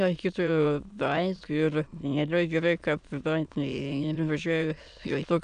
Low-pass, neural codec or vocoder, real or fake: 9.9 kHz; autoencoder, 22.05 kHz, a latent of 192 numbers a frame, VITS, trained on many speakers; fake